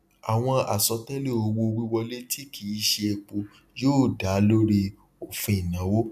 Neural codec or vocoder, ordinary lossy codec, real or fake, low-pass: none; none; real; 14.4 kHz